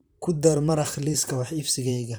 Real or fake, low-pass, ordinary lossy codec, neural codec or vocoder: fake; none; none; vocoder, 44.1 kHz, 128 mel bands, Pupu-Vocoder